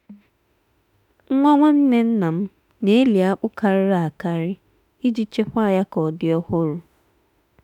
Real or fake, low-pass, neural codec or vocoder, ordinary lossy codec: fake; 19.8 kHz; autoencoder, 48 kHz, 32 numbers a frame, DAC-VAE, trained on Japanese speech; none